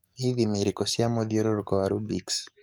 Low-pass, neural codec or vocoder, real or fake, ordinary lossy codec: none; codec, 44.1 kHz, 7.8 kbps, DAC; fake; none